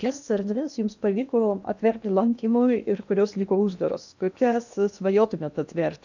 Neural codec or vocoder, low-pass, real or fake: codec, 16 kHz in and 24 kHz out, 0.8 kbps, FocalCodec, streaming, 65536 codes; 7.2 kHz; fake